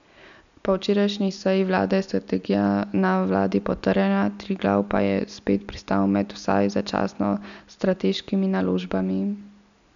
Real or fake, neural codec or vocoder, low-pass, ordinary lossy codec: real; none; 7.2 kHz; none